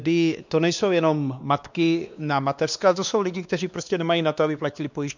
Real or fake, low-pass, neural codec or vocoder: fake; 7.2 kHz; codec, 16 kHz, 2 kbps, X-Codec, WavLM features, trained on Multilingual LibriSpeech